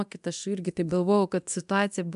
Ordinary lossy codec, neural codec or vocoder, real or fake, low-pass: MP3, 96 kbps; codec, 24 kHz, 0.9 kbps, DualCodec; fake; 10.8 kHz